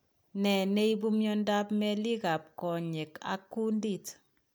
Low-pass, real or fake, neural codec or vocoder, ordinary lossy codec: none; real; none; none